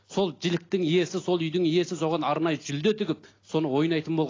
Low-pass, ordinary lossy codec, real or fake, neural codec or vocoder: 7.2 kHz; AAC, 32 kbps; real; none